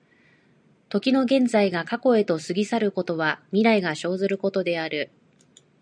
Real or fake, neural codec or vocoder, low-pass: real; none; 9.9 kHz